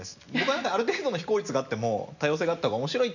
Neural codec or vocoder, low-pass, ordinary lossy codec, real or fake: none; 7.2 kHz; none; real